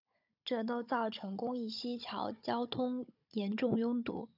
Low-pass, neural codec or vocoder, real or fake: 5.4 kHz; codec, 16 kHz, 16 kbps, FunCodec, trained on Chinese and English, 50 frames a second; fake